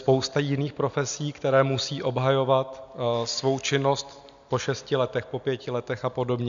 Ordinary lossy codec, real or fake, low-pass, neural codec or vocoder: MP3, 64 kbps; real; 7.2 kHz; none